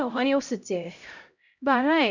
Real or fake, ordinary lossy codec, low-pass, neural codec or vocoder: fake; none; 7.2 kHz; codec, 16 kHz, 0.5 kbps, X-Codec, HuBERT features, trained on LibriSpeech